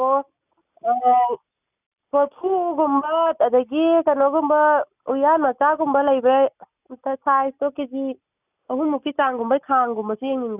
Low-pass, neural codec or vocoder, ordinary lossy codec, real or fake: 3.6 kHz; none; none; real